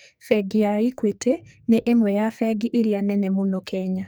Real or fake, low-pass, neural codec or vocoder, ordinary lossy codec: fake; none; codec, 44.1 kHz, 2.6 kbps, SNAC; none